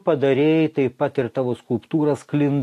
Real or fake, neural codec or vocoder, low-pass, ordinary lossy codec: real; none; 14.4 kHz; AAC, 64 kbps